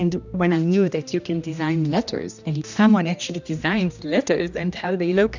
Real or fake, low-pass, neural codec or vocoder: fake; 7.2 kHz; codec, 16 kHz, 1 kbps, X-Codec, HuBERT features, trained on general audio